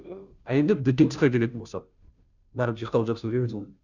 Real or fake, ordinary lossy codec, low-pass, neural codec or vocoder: fake; none; 7.2 kHz; codec, 16 kHz, 0.5 kbps, FunCodec, trained on Chinese and English, 25 frames a second